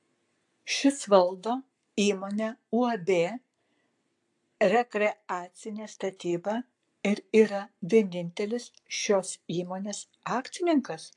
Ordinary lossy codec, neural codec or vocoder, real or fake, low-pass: AAC, 64 kbps; codec, 44.1 kHz, 7.8 kbps, Pupu-Codec; fake; 10.8 kHz